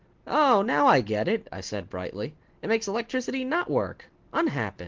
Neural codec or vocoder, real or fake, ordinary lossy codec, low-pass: none; real; Opus, 16 kbps; 7.2 kHz